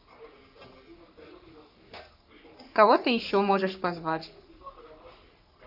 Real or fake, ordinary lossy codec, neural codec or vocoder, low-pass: fake; none; codec, 44.1 kHz, 3.4 kbps, Pupu-Codec; 5.4 kHz